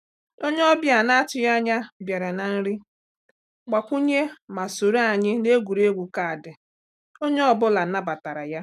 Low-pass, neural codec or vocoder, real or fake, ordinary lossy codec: 14.4 kHz; none; real; none